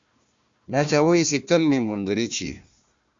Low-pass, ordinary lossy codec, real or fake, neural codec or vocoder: 7.2 kHz; Opus, 64 kbps; fake; codec, 16 kHz, 1 kbps, FunCodec, trained on Chinese and English, 50 frames a second